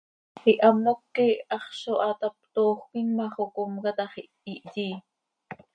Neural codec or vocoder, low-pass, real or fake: none; 9.9 kHz; real